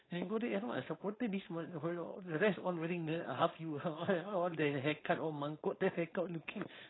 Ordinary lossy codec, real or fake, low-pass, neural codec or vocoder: AAC, 16 kbps; fake; 7.2 kHz; codec, 16 kHz, 4.8 kbps, FACodec